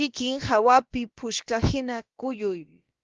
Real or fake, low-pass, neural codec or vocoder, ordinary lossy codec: fake; 7.2 kHz; codec, 16 kHz, about 1 kbps, DyCAST, with the encoder's durations; Opus, 32 kbps